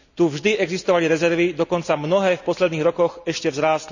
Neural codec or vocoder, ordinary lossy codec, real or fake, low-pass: none; none; real; 7.2 kHz